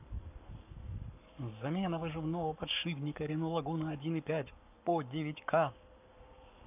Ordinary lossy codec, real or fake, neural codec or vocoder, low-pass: none; fake; codec, 44.1 kHz, 7.8 kbps, Pupu-Codec; 3.6 kHz